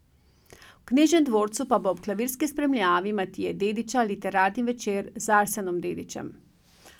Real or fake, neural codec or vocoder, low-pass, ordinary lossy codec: real; none; 19.8 kHz; none